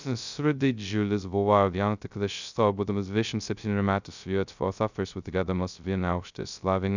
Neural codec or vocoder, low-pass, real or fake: codec, 16 kHz, 0.2 kbps, FocalCodec; 7.2 kHz; fake